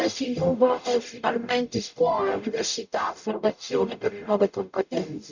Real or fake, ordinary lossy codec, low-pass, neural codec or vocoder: fake; none; 7.2 kHz; codec, 44.1 kHz, 0.9 kbps, DAC